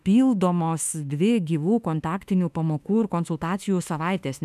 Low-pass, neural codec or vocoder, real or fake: 14.4 kHz; autoencoder, 48 kHz, 32 numbers a frame, DAC-VAE, trained on Japanese speech; fake